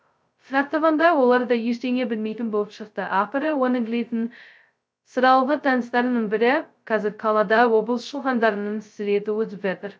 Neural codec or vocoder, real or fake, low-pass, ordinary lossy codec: codec, 16 kHz, 0.2 kbps, FocalCodec; fake; none; none